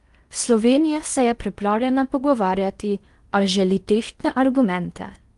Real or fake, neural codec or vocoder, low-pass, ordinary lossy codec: fake; codec, 16 kHz in and 24 kHz out, 0.8 kbps, FocalCodec, streaming, 65536 codes; 10.8 kHz; Opus, 32 kbps